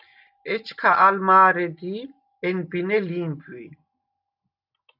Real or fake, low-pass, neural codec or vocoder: real; 5.4 kHz; none